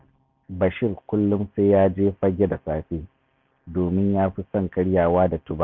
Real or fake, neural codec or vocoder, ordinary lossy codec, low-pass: real; none; MP3, 64 kbps; 7.2 kHz